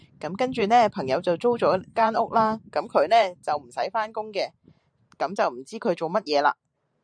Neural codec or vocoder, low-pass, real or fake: vocoder, 44.1 kHz, 128 mel bands every 512 samples, BigVGAN v2; 9.9 kHz; fake